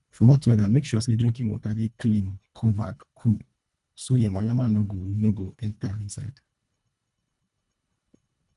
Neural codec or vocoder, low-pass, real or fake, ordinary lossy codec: codec, 24 kHz, 1.5 kbps, HILCodec; 10.8 kHz; fake; none